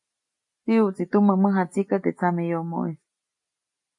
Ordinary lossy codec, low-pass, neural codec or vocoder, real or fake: MP3, 48 kbps; 10.8 kHz; none; real